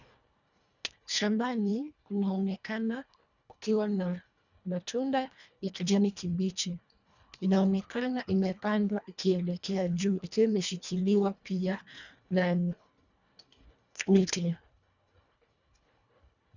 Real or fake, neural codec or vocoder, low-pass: fake; codec, 24 kHz, 1.5 kbps, HILCodec; 7.2 kHz